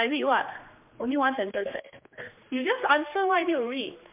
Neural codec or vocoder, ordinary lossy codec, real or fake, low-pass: codec, 16 kHz, 2 kbps, X-Codec, HuBERT features, trained on general audio; MP3, 32 kbps; fake; 3.6 kHz